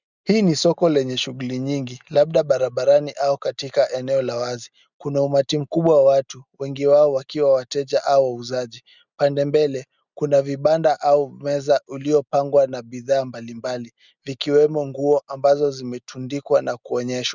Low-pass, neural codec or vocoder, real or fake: 7.2 kHz; none; real